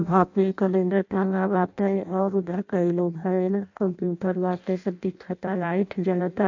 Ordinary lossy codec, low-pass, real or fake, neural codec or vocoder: AAC, 48 kbps; 7.2 kHz; fake; codec, 16 kHz in and 24 kHz out, 0.6 kbps, FireRedTTS-2 codec